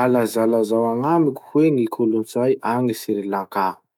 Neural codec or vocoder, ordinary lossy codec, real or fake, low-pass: autoencoder, 48 kHz, 128 numbers a frame, DAC-VAE, trained on Japanese speech; none; fake; 19.8 kHz